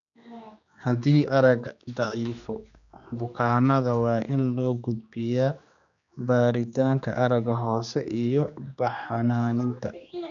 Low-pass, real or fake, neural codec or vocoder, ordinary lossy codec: 7.2 kHz; fake; codec, 16 kHz, 2 kbps, X-Codec, HuBERT features, trained on general audio; none